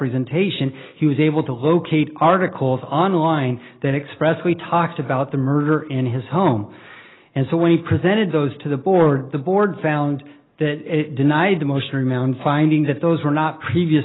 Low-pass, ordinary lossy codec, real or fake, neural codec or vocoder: 7.2 kHz; AAC, 16 kbps; real; none